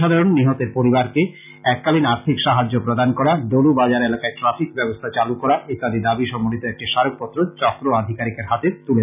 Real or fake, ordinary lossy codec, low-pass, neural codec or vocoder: real; none; 3.6 kHz; none